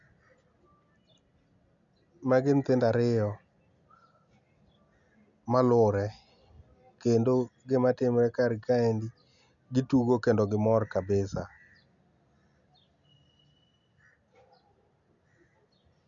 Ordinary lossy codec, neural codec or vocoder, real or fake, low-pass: none; none; real; 7.2 kHz